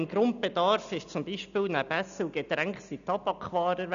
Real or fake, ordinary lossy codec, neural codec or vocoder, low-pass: real; none; none; 7.2 kHz